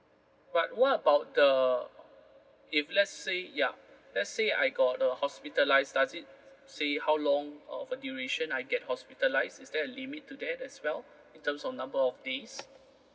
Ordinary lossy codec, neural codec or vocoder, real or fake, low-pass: none; none; real; none